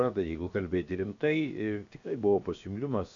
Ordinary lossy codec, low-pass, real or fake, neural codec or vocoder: AAC, 64 kbps; 7.2 kHz; fake; codec, 16 kHz, 0.7 kbps, FocalCodec